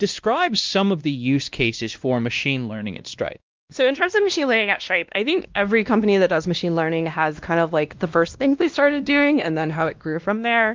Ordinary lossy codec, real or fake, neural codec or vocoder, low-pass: Opus, 32 kbps; fake; codec, 16 kHz, 1 kbps, X-Codec, WavLM features, trained on Multilingual LibriSpeech; 7.2 kHz